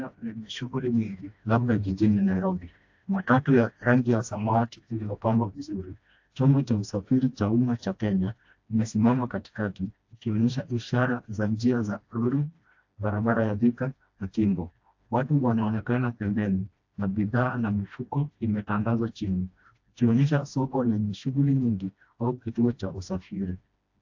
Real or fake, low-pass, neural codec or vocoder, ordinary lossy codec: fake; 7.2 kHz; codec, 16 kHz, 1 kbps, FreqCodec, smaller model; AAC, 48 kbps